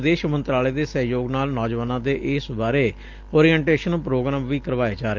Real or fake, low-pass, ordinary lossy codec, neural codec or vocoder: real; 7.2 kHz; Opus, 24 kbps; none